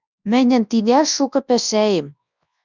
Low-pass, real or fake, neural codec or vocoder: 7.2 kHz; fake; codec, 24 kHz, 0.9 kbps, WavTokenizer, large speech release